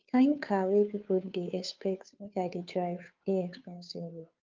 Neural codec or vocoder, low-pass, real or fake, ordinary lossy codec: codec, 16 kHz, 2 kbps, FunCodec, trained on Chinese and English, 25 frames a second; 7.2 kHz; fake; Opus, 24 kbps